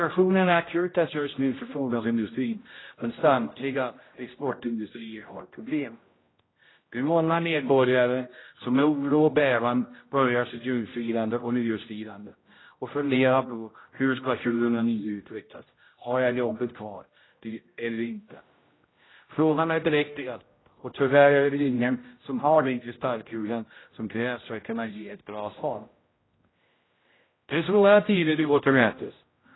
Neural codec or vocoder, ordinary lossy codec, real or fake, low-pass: codec, 16 kHz, 0.5 kbps, X-Codec, HuBERT features, trained on general audio; AAC, 16 kbps; fake; 7.2 kHz